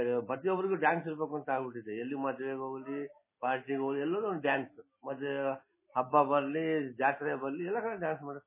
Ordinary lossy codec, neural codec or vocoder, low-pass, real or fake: MP3, 16 kbps; none; 3.6 kHz; real